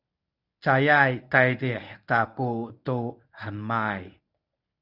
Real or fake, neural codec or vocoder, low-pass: fake; codec, 24 kHz, 0.9 kbps, WavTokenizer, medium speech release version 1; 5.4 kHz